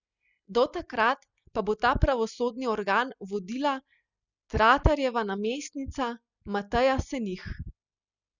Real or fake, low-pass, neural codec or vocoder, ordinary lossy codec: real; 7.2 kHz; none; none